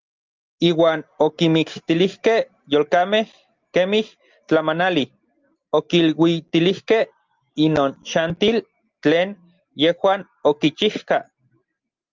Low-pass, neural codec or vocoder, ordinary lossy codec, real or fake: 7.2 kHz; none; Opus, 24 kbps; real